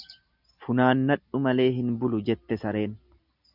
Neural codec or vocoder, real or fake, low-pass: none; real; 5.4 kHz